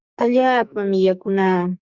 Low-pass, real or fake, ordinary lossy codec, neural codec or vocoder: 7.2 kHz; fake; Opus, 64 kbps; codec, 44.1 kHz, 2.6 kbps, SNAC